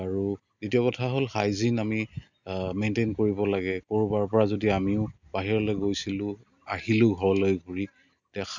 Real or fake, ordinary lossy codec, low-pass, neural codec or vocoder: real; none; 7.2 kHz; none